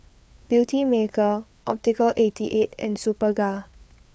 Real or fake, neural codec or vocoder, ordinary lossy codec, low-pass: fake; codec, 16 kHz, 4 kbps, FreqCodec, larger model; none; none